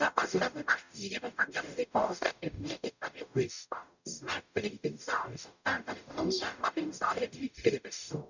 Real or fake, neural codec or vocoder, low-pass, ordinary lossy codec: fake; codec, 44.1 kHz, 0.9 kbps, DAC; 7.2 kHz; none